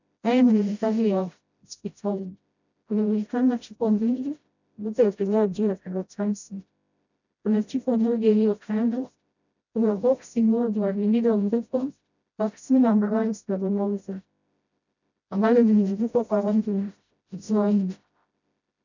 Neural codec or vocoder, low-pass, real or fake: codec, 16 kHz, 0.5 kbps, FreqCodec, smaller model; 7.2 kHz; fake